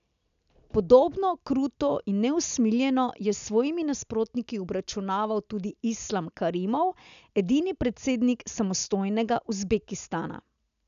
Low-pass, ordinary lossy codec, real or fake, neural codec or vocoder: 7.2 kHz; none; real; none